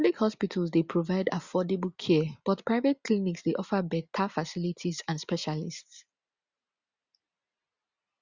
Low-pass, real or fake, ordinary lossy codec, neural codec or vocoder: 7.2 kHz; real; Opus, 64 kbps; none